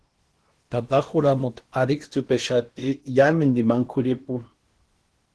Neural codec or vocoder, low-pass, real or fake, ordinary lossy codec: codec, 16 kHz in and 24 kHz out, 0.8 kbps, FocalCodec, streaming, 65536 codes; 10.8 kHz; fake; Opus, 16 kbps